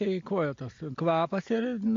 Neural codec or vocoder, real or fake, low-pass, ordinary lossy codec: none; real; 7.2 kHz; MP3, 48 kbps